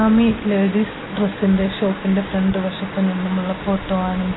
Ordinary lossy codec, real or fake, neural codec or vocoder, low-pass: AAC, 16 kbps; real; none; 7.2 kHz